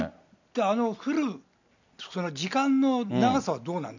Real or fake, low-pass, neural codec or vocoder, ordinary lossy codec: real; 7.2 kHz; none; none